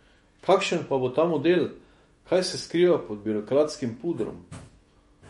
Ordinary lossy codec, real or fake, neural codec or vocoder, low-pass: MP3, 48 kbps; fake; autoencoder, 48 kHz, 128 numbers a frame, DAC-VAE, trained on Japanese speech; 19.8 kHz